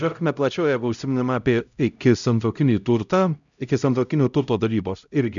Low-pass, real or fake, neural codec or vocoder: 7.2 kHz; fake; codec, 16 kHz, 0.5 kbps, X-Codec, HuBERT features, trained on LibriSpeech